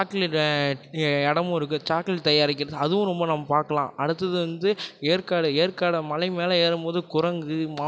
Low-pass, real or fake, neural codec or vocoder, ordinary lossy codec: none; real; none; none